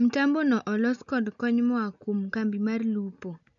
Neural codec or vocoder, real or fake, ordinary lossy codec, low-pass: none; real; none; 7.2 kHz